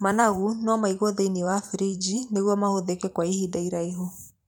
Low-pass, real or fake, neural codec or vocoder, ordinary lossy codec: none; fake; vocoder, 44.1 kHz, 128 mel bands every 512 samples, BigVGAN v2; none